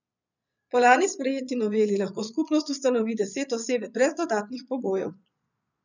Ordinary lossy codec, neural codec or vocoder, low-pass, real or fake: none; vocoder, 22.05 kHz, 80 mel bands, Vocos; 7.2 kHz; fake